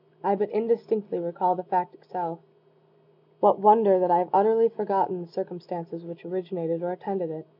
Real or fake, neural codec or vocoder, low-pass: real; none; 5.4 kHz